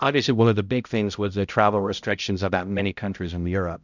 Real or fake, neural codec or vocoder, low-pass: fake; codec, 16 kHz, 0.5 kbps, X-Codec, HuBERT features, trained on balanced general audio; 7.2 kHz